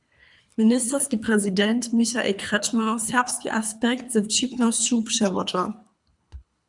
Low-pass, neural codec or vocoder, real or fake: 10.8 kHz; codec, 24 kHz, 3 kbps, HILCodec; fake